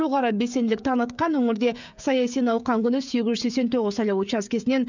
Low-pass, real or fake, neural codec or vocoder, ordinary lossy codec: 7.2 kHz; fake; codec, 16 kHz, 16 kbps, FreqCodec, smaller model; none